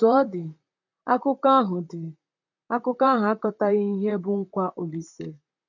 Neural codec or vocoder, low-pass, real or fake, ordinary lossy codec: vocoder, 44.1 kHz, 128 mel bands, Pupu-Vocoder; 7.2 kHz; fake; none